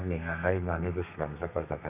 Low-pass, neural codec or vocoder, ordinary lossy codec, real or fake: 3.6 kHz; codec, 32 kHz, 1.9 kbps, SNAC; none; fake